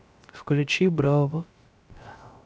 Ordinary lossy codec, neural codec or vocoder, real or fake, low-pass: none; codec, 16 kHz, 0.3 kbps, FocalCodec; fake; none